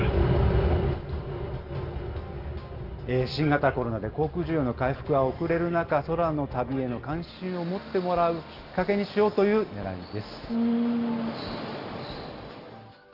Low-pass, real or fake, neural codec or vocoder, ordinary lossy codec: 5.4 kHz; real; none; Opus, 32 kbps